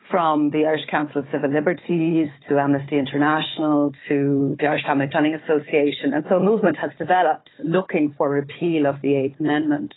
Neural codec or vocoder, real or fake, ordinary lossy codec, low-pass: codec, 16 kHz, 4 kbps, FunCodec, trained on Chinese and English, 50 frames a second; fake; AAC, 16 kbps; 7.2 kHz